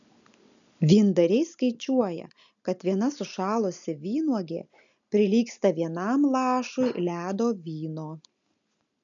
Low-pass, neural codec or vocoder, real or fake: 7.2 kHz; none; real